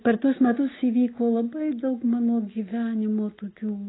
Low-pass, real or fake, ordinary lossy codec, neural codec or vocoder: 7.2 kHz; real; AAC, 16 kbps; none